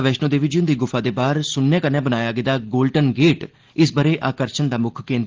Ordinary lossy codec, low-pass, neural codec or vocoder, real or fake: Opus, 16 kbps; 7.2 kHz; none; real